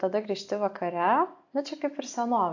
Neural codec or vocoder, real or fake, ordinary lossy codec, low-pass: none; real; AAC, 48 kbps; 7.2 kHz